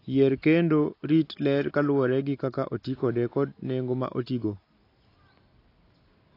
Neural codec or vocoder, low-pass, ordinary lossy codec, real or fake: none; 5.4 kHz; AAC, 32 kbps; real